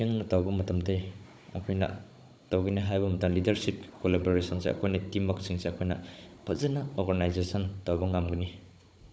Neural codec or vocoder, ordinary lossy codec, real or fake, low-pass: codec, 16 kHz, 16 kbps, FunCodec, trained on Chinese and English, 50 frames a second; none; fake; none